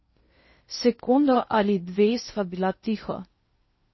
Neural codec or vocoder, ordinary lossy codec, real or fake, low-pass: codec, 16 kHz in and 24 kHz out, 0.6 kbps, FocalCodec, streaming, 2048 codes; MP3, 24 kbps; fake; 7.2 kHz